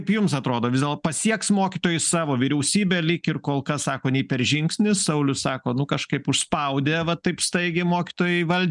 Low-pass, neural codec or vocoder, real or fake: 10.8 kHz; none; real